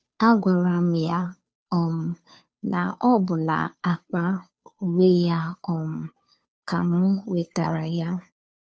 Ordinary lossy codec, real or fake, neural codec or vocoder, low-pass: none; fake; codec, 16 kHz, 2 kbps, FunCodec, trained on Chinese and English, 25 frames a second; none